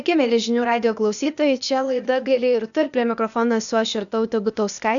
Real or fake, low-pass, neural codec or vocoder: fake; 7.2 kHz; codec, 16 kHz, 0.8 kbps, ZipCodec